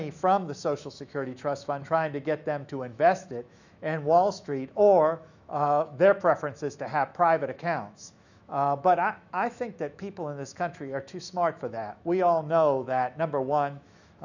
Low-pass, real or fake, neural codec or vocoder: 7.2 kHz; fake; autoencoder, 48 kHz, 128 numbers a frame, DAC-VAE, trained on Japanese speech